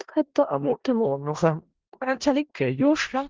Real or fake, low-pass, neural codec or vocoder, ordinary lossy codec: fake; 7.2 kHz; codec, 16 kHz in and 24 kHz out, 0.4 kbps, LongCat-Audio-Codec, four codebook decoder; Opus, 16 kbps